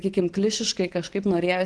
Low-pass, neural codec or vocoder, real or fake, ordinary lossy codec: 10.8 kHz; none; real; Opus, 16 kbps